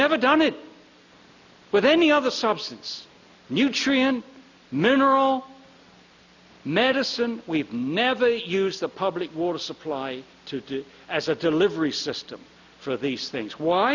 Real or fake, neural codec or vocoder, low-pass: real; none; 7.2 kHz